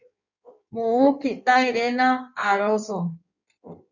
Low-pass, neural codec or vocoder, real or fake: 7.2 kHz; codec, 16 kHz in and 24 kHz out, 1.1 kbps, FireRedTTS-2 codec; fake